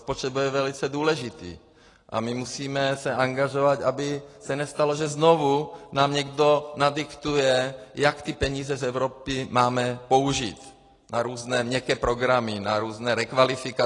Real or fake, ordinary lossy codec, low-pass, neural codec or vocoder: real; AAC, 32 kbps; 10.8 kHz; none